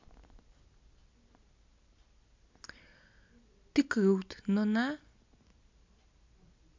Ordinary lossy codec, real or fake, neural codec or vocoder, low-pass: MP3, 64 kbps; real; none; 7.2 kHz